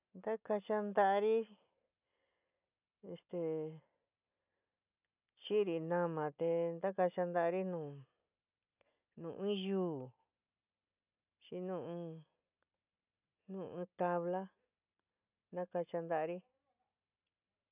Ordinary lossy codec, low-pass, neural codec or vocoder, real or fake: none; 3.6 kHz; none; real